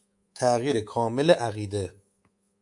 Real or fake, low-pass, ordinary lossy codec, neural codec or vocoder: fake; 10.8 kHz; AAC, 64 kbps; codec, 24 kHz, 3.1 kbps, DualCodec